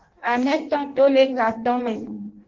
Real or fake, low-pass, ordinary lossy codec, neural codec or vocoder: fake; 7.2 kHz; Opus, 16 kbps; codec, 16 kHz in and 24 kHz out, 0.6 kbps, FireRedTTS-2 codec